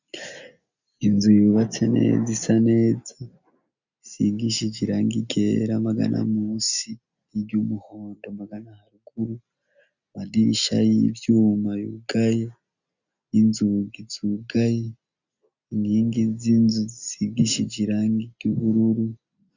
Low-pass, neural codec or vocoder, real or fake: 7.2 kHz; none; real